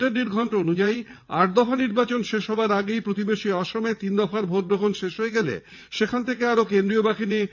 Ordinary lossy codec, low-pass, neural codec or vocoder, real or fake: none; 7.2 kHz; vocoder, 22.05 kHz, 80 mel bands, WaveNeXt; fake